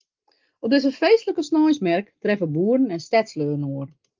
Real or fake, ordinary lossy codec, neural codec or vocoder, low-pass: real; Opus, 32 kbps; none; 7.2 kHz